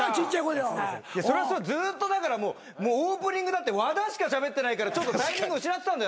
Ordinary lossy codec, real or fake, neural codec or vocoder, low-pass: none; real; none; none